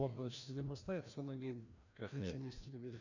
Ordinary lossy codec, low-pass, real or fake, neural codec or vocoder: none; 7.2 kHz; fake; codec, 16 kHz, 1 kbps, FreqCodec, larger model